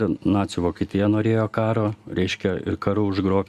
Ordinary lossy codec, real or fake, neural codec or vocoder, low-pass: Opus, 64 kbps; real; none; 14.4 kHz